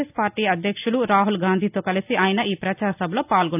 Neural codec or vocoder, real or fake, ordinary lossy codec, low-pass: none; real; AAC, 32 kbps; 3.6 kHz